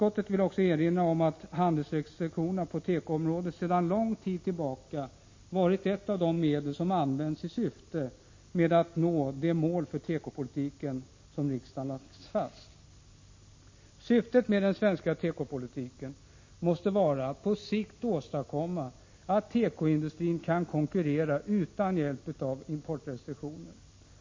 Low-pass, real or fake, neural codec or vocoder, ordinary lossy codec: 7.2 kHz; real; none; MP3, 32 kbps